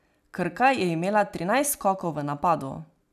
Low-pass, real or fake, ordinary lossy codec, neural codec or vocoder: 14.4 kHz; real; none; none